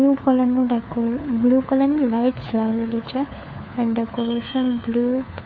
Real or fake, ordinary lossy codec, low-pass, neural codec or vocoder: fake; none; none; codec, 16 kHz, 4 kbps, FunCodec, trained on LibriTTS, 50 frames a second